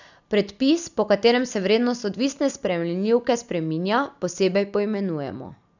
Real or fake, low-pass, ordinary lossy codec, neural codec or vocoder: real; 7.2 kHz; none; none